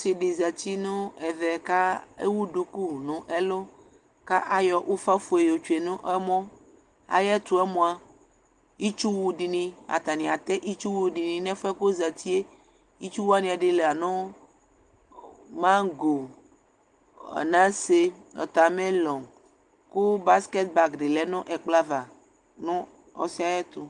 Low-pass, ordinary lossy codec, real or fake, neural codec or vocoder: 10.8 kHz; Opus, 24 kbps; real; none